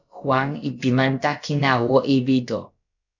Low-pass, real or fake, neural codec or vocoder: 7.2 kHz; fake; codec, 16 kHz, about 1 kbps, DyCAST, with the encoder's durations